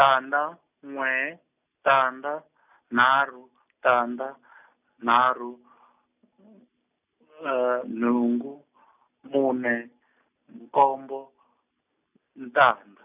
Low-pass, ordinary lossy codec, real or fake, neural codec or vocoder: 3.6 kHz; none; real; none